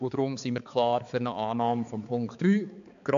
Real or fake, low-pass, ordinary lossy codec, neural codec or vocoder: fake; 7.2 kHz; AAC, 64 kbps; codec, 16 kHz, 4 kbps, X-Codec, HuBERT features, trained on balanced general audio